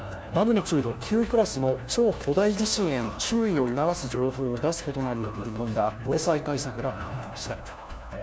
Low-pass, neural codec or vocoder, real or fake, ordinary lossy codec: none; codec, 16 kHz, 1 kbps, FunCodec, trained on LibriTTS, 50 frames a second; fake; none